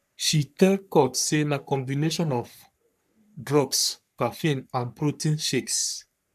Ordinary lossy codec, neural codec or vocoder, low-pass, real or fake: none; codec, 44.1 kHz, 3.4 kbps, Pupu-Codec; 14.4 kHz; fake